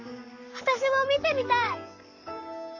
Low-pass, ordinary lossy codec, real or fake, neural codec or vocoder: 7.2 kHz; none; fake; codec, 44.1 kHz, 7.8 kbps, Pupu-Codec